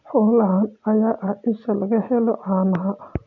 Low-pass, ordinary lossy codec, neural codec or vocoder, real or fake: 7.2 kHz; none; none; real